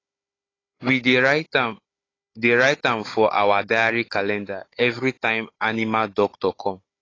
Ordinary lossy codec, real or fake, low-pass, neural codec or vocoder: AAC, 32 kbps; fake; 7.2 kHz; codec, 16 kHz, 16 kbps, FunCodec, trained on Chinese and English, 50 frames a second